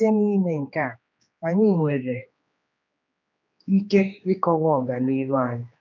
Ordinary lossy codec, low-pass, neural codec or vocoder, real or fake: none; 7.2 kHz; codec, 16 kHz, 2 kbps, X-Codec, HuBERT features, trained on general audio; fake